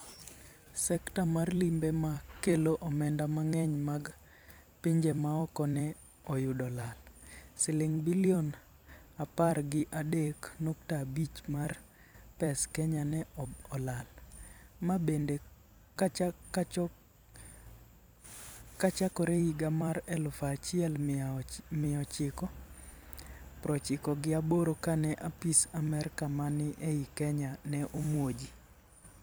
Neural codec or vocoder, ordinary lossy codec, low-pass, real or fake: vocoder, 44.1 kHz, 128 mel bands every 256 samples, BigVGAN v2; none; none; fake